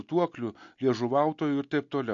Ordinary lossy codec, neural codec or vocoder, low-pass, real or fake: MP3, 48 kbps; none; 7.2 kHz; real